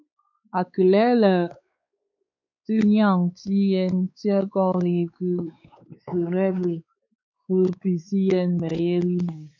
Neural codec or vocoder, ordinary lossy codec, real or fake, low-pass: codec, 16 kHz, 4 kbps, X-Codec, WavLM features, trained on Multilingual LibriSpeech; MP3, 64 kbps; fake; 7.2 kHz